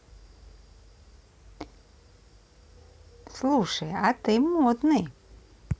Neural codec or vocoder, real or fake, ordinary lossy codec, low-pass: none; real; none; none